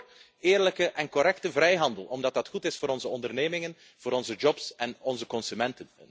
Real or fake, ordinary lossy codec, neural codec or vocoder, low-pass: real; none; none; none